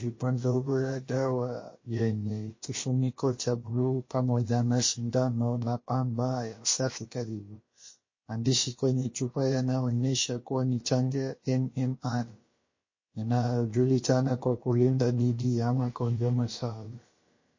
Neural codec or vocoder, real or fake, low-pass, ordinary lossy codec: codec, 16 kHz, about 1 kbps, DyCAST, with the encoder's durations; fake; 7.2 kHz; MP3, 32 kbps